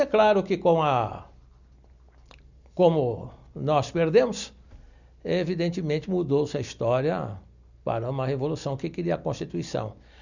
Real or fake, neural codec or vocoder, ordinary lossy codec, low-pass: real; none; none; 7.2 kHz